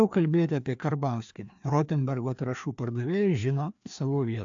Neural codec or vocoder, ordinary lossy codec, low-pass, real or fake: codec, 16 kHz, 2 kbps, FreqCodec, larger model; AAC, 64 kbps; 7.2 kHz; fake